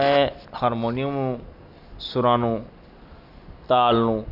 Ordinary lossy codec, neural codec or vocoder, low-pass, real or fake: none; none; 5.4 kHz; real